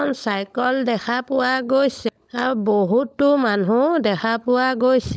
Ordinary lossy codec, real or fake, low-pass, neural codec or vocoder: none; fake; none; codec, 16 kHz, 16 kbps, FunCodec, trained on LibriTTS, 50 frames a second